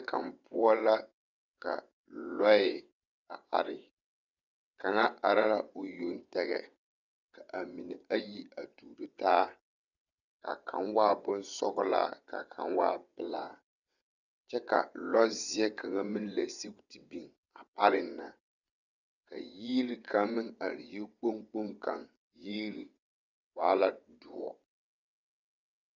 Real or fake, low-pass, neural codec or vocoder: fake; 7.2 kHz; vocoder, 22.05 kHz, 80 mel bands, WaveNeXt